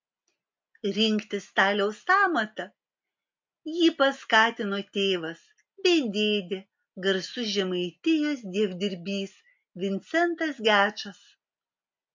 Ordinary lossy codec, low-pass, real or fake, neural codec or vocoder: MP3, 64 kbps; 7.2 kHz; real; none